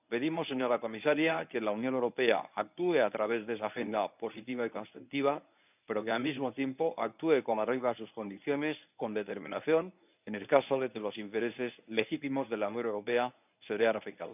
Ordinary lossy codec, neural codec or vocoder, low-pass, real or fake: none; codec, 24 kHz, 0.9 kbps, WavTokenizer, medium speech release version 1; 3.6 kHz; fake